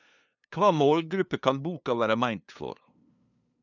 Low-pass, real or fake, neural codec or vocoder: 7.2 kHz; fake; codec, 16 kHz, 2 kbps, FunCodec, trained on LibriTTS, 25 frames a second